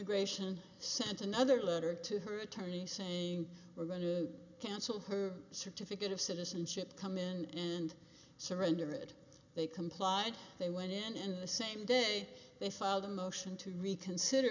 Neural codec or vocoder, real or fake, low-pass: none; real; 7.2 kHz